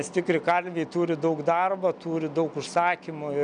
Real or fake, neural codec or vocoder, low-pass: real; none; 9.9 kHz